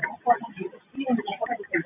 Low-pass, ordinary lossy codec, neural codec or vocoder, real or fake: 3.6 kHz; AAC, 32 kbps; none; real